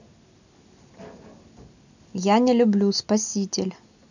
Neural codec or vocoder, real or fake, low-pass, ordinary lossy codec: none; real; 7.2 kHz; none